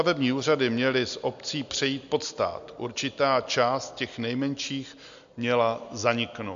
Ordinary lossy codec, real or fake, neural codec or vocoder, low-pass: MP3, 64 kbps; real; none; 7.2 kHz